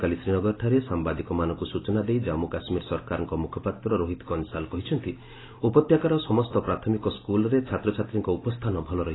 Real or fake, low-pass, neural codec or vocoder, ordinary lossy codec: real; 7.2 kHz; none; AAC, 16 kbps